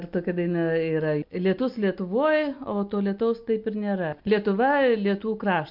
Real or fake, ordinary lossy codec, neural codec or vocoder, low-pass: real; MP3, 48 kbps; none; 5.4 kHz